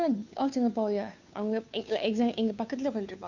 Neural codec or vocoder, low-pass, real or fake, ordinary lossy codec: codec, 16 kHz, 2 kbps, FunCodec, trained on Chinese and English, 25 frames a second; 7.2 kHz; fake; none